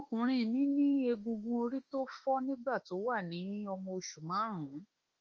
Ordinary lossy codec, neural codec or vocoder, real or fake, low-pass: Opus, 24 kbps; autoencoder, 48 kHz, 32 numbers a frame, DAC-VAE, trained on Japanese speech; fake; 7.2 kHz